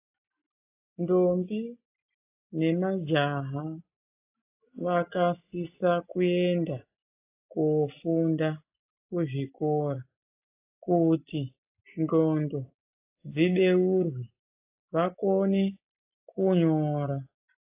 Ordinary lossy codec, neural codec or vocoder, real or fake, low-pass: AAC, 24 kbps; none; real; 3.6 kHz